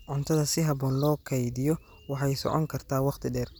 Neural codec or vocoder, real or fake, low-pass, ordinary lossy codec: none; real; none; none